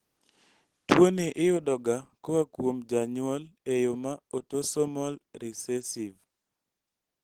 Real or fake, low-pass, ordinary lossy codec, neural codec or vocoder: real; 19.8 kHz; Opus, 16 kbps; none